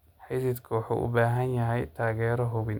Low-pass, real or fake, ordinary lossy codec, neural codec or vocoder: 19.8 kHz; real; none; none